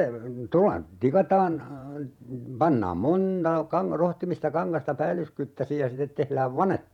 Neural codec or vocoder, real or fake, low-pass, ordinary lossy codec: vocoder, 48 kHz, 128 mel bands, Vocos; fake; 19.8 kHz; none